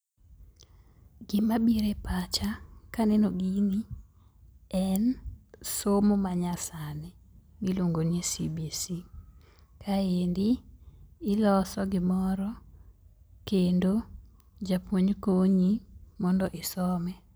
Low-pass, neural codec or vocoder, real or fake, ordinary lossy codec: none; none; real; none